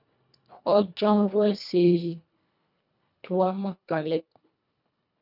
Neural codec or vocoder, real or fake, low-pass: codec, 24 kHz, 1.5 kbps, HILCodec; fake; 5.4 kHz